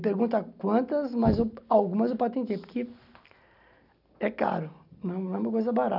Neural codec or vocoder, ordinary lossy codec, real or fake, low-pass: none; none; real; 5.4 kHz